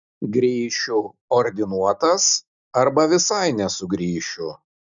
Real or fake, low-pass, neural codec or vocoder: real; 7.2 kHz; none